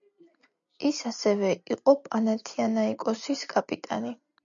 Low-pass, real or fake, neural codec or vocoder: 7.2 kHz; real; none